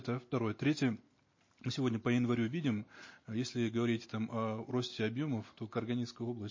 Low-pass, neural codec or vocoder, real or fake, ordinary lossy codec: 7.2 kHz; none; real; MP3, 32 kbps